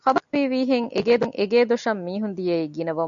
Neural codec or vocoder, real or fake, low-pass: none; real; 7.2 kHz